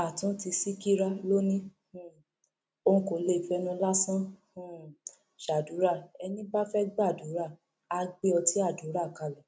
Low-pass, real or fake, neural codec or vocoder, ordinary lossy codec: none; real; none; none